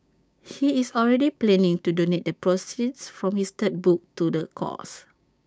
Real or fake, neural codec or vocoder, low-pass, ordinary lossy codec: fake; codec, 16 kHz, 6 kbps, DAC; none; none